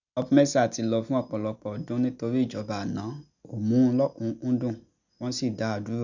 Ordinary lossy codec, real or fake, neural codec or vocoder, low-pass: none; real; none; 7.2 kHz